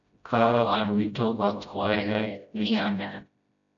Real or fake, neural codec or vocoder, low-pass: fake; codec, 16 kHz, 0.5 kbps, FreqCodec, smaller model; 7.2 kHz